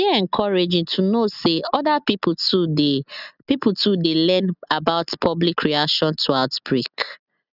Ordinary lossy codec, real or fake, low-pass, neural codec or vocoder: none; real; 5.4 kHz; none